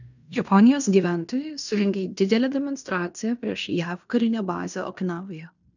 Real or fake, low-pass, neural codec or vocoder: fake; 7.2 kHz; codec, 16 kHz in and 24 kHz out, 0.9 kbps, LongCat-Audio-Codec, fine tuned four codebook decoder